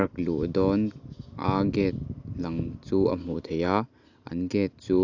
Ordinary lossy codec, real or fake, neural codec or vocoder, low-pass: AAC, 48 kbps; real; none; 7.2 kHz